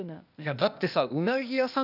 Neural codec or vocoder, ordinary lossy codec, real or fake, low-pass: codec, 16 kHz, 0.8 kbps, ZipCodec; none; fake; 5.4 kHz